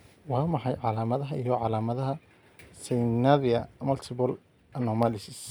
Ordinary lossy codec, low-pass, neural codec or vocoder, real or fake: none; none; none; real